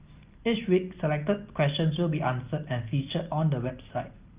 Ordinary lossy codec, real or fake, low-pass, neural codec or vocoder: Opus, 24 kbps; real; 3.6 kHz; none